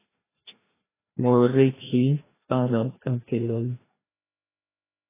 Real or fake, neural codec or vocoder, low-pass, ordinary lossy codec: fake; codec, 16 kHz, 1 kbps, FreqCodec, larger model; 3.6 kHz; AAC, 16 kbps